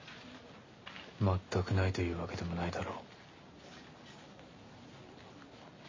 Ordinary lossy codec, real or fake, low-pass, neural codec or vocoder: MP3, 32 kbps; real; 7.2 kHz; none